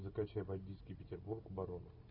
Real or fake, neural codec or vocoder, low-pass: real; none; 5.4 kHz